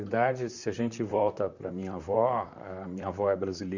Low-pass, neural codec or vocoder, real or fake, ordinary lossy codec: 7.2 kHz; vocoder, 44.1 kHz, 128 mel bands, Pupu-Vocoder; fake; none